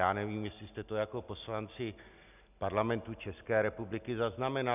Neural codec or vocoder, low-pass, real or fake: none; 3.6 kHz; real